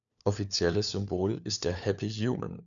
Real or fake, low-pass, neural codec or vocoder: fake; 7.2 kHz; codec, 16 kHz, 4 kbps, FunCodec, trained on LibriTTS, 50 frames a second